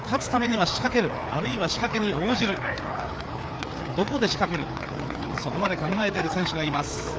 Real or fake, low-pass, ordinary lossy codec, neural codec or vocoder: fake; none; none; codec, 16 kHz, 4 kbps, FreqCodec, larger model